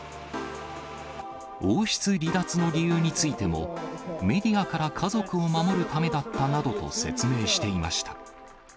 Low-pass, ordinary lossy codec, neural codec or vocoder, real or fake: none; none; none; real